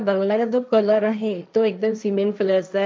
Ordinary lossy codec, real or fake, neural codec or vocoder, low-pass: none; fake; codec, 16 kHz, 1.1 kbps, Voila-Tokenizer; none